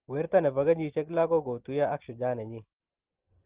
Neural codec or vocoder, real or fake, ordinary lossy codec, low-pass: none; real; Opus, 16 kbps; 3.6 kHz